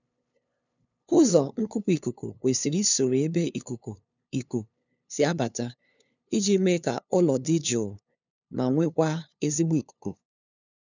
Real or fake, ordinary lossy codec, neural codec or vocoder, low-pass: fake; none; codec, 16 kHz, 2 kbps, FunCodec, trained on LibriTTS, 25 frames a second; 7.2 kHz